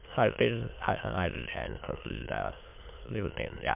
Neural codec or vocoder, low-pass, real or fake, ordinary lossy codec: autoencoder, 22.05 kHz, a latent of 192 numbers a frame, VITS, trained on many speakers; 3.6 kHz; fake; MP3, 32 kbps